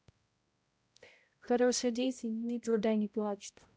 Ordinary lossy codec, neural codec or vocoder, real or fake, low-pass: none; codec, 16 kHz, 0.5 kbps, X-Codec, HuBERT features, trained on balanced general audio; fake; none